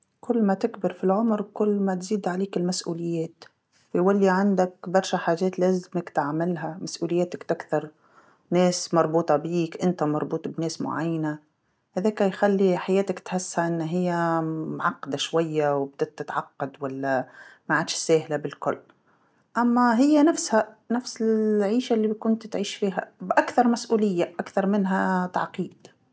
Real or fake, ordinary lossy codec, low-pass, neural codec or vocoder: real; none; none; none